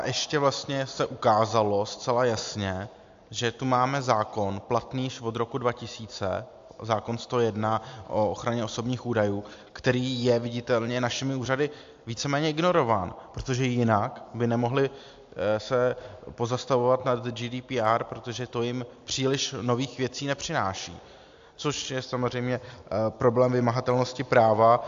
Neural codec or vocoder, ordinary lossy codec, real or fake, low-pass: none; AAC, 64 kbps; real; 7.2 kHz